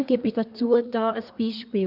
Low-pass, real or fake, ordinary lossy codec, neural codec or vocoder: 5.4 kHz; fake; none; codec, 24 kHz, 1 kbps, SNAC